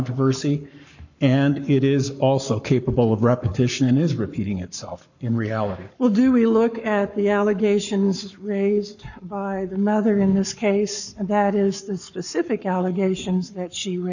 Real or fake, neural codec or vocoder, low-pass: fake; codec, 44.1 kHz, 7.8 kbps, Pupu-Codec; 7.2 kHz